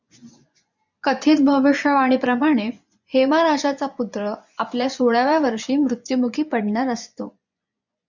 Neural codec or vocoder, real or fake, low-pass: vocoder, 24 kHz, 100 mel bands, Vocos; fake; 7.2 kHz